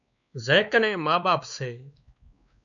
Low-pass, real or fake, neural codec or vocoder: 7.2 kHz; fake; codec, 16 kHz, 2 kbps, X-Codec, WavLM features, trained on Multilingual LibriSpeech